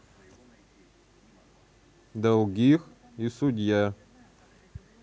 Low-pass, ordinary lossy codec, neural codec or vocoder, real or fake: none; none; none; real